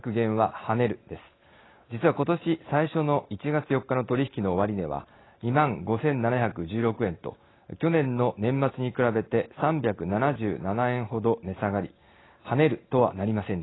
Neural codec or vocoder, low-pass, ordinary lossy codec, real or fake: none; 7.2 kHz; AAC, 16 kbps; real